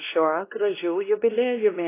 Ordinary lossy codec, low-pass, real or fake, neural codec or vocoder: AAC, 24 kbps; 3.6 kHz; fake; codec, 16 kHz, 1 kbps, X-Codec, WavLM features, trained on Multilingual LibriSpeech